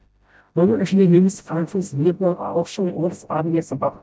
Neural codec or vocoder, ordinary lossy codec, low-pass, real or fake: codec, 16 kHz, 0.5 kbps, FreqCodec, smaller model; none; none; fake